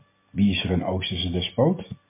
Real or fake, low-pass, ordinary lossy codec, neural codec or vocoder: real; 3.6 kHz; MP3, 24 kbps; none